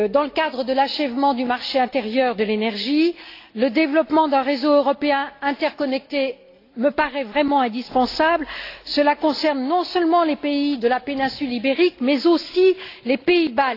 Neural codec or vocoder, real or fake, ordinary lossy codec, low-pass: none; real; AAC, 32 kbps; 5.4 kHz